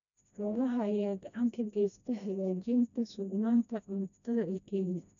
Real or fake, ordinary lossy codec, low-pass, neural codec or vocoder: fake; none; 7.2 kHz; codec, 16 kHz, 1 kbps, FreqCodec, smaller model